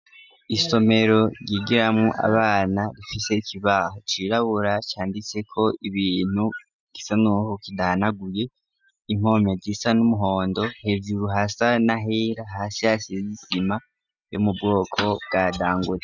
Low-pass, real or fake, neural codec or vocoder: 7.2 kHz; real; none